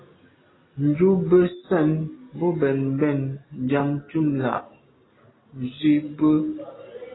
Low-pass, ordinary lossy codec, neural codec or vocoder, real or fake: 7.2 kHz; AAC, 16 kbps; none; real